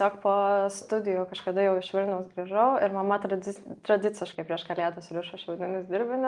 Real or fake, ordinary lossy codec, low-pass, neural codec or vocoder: real; Opus, 32 kbps; 10.8 kHz; none